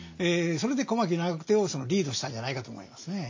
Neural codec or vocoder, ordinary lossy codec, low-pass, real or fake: none; MP3, 48 kbps; 7.2 kHz; real